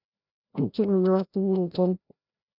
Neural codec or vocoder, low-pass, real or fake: codec, 16 kHz, 0.5 kbps, FreqCodec, larger model; 5.4 kHz; fake